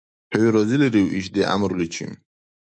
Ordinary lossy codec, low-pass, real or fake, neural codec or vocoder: MP3, 96 kbps; 9.9 kHz; fake; autoencoder, 48 kHz, 128 numbers a frame, DAC-VAE, trained on Japanese speech